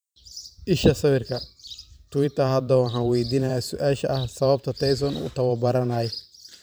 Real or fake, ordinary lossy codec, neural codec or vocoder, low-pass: fake; none; vocoder, 44.1 kHz, 128 mel bands every 512 samples, BigVGAN v2; none